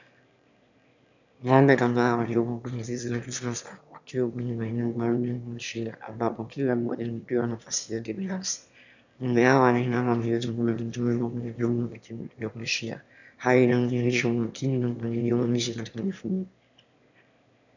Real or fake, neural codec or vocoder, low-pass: fake; autoencoder, 22.05 kHz, a latent of 192 numbers a frame, VITS, trained on one speaker; 7.2 kHz